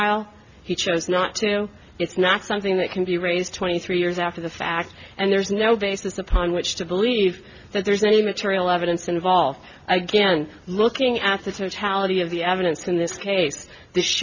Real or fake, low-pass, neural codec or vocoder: real; 7.2 kHz; none